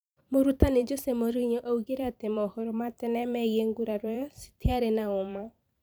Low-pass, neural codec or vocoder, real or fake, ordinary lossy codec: none; vocoder, 44.1 kHz, 128 mel bands every 512 samples, BigVGAN v2; fake; none